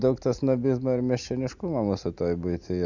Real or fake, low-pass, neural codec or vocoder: real; 7.2 kHz; none